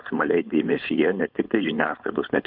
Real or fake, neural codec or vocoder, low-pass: fake; codec, 16 kHz, 4.8 kbps, FACodec; 5.4 kHz